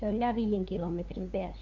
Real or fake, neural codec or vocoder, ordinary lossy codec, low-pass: fake; codec, 16 kHz, 2 kbps, FunCodec, trained on LibriTTS, 25 frames a second; Opus, 64 kbps; 7.2 kHz